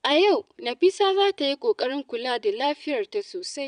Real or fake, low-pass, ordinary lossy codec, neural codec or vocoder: fake; 9.9 kHz; none; vocoder, 22.05 kHz, 80 mel bands, Vocos